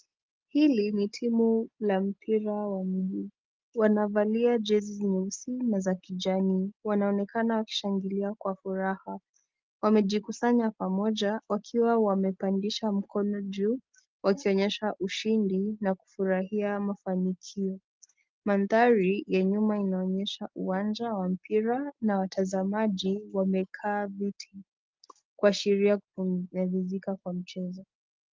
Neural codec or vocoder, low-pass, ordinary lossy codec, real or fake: none; 7.2 kHz; Opus, 32 kbps; real